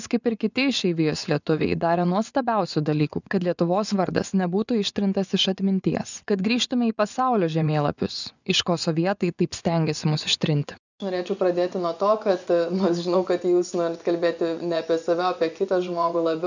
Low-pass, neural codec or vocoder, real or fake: 7.2 kHz; vocoder, 24 kHz, 100 mel bands, Vocos; fake